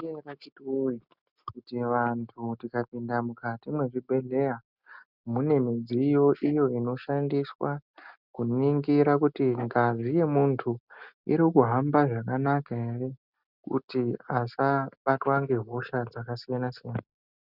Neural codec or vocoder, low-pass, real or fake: none; 5.4 kHz; real